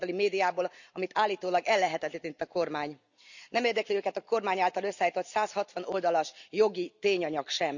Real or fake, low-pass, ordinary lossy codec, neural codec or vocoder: real; 7.2 kHz; none; none